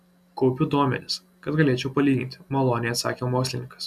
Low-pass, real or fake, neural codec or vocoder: 14.4 kHz; real; none